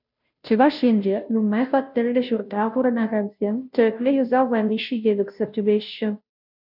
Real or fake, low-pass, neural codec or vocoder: fake; 5.4 kHz; codec, 16 kHz, 0.5 kbps, FunCodec, trained on Chinese and English, 25 frames a second